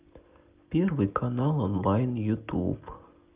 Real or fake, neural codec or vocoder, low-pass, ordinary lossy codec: real; none; 3.6 kHz; Opus, 24 kbps